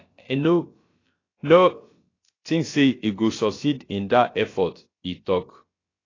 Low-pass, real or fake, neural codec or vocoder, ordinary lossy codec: 7.2 kHz; fake; codec, 16 kHz, about 1 kbps, DyCAST, with the encoder's durations; AAC, 32 kbps